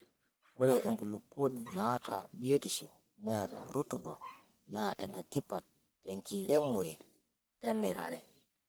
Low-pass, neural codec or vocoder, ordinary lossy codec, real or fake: none; codec, 44.1 kHz, 1.7 kbps, Pupu-Codec; none; fake